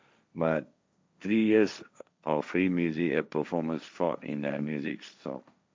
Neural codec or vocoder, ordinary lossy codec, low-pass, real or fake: codec, 16 kHz, 1.1 kbps, Voila-Tokenizer; none; none; fake